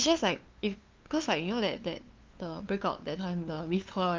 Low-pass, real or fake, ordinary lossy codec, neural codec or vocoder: 7.2 kHz; fake; Opus, 32 kbps; codec, 16 kHz, 2 kbps, FunCodec, trained on LibriTTS, 25 frames a second